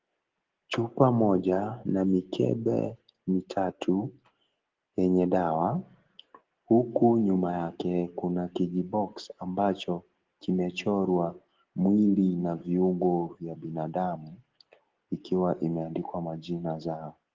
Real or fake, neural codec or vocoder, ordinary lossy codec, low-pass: real; none; Opus, 16 kbps; 7.2 kHz